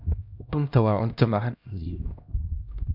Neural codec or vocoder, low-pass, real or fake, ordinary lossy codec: codec, 16 kHz, 1 kbps, X-Codec, HuBERT features, trained on LibriSpeech; 5.4 kHz; fake; none